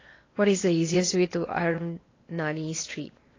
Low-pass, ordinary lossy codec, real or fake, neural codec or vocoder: 7.2 kHz; AAC, 32 kbps; fake; codec, 16 kHz in and 24 kHz out, 0.8 kbps, FocalCodec, streaming, 65536 codes